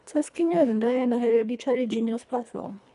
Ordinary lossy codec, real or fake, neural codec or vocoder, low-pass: none; fake; codec, 24 kHz, 1.5 kbps, HILCodec; 10.8 kHz